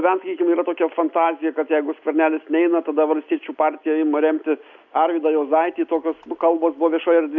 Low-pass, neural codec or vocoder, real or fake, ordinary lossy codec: 7.2 kHz; none; real; MP3, 64 kbps